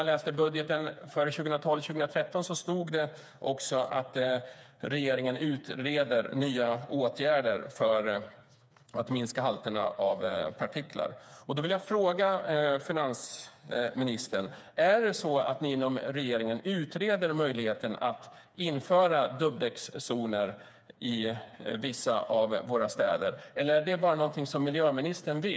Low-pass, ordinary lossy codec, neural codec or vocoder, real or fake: none; none; codec, 16 kHz, 4 kbps, FreqCodec, smaller model; fake